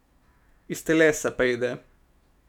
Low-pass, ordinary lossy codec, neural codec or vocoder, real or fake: 19.8 kHz; none; autoencoder, 48 kHz, 128 numbers a frame, DAC-VAE, trained on Japanese speech; fake